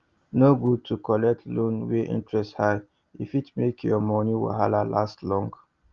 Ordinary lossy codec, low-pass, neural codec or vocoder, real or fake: Opus, 32 kbps; 7.2 kHz; none; real